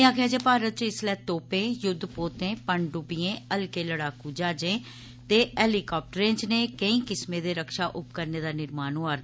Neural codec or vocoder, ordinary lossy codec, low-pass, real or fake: none; none; none; real